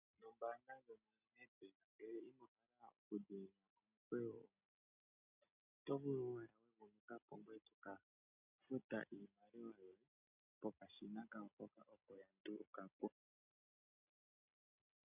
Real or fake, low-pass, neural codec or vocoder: real; 3.6 kHz; none